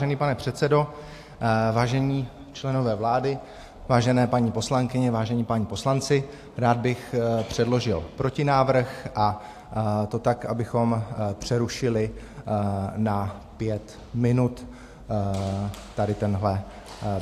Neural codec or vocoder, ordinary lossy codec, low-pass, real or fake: none; AAC, 64 kbps; 14.4 kHz; real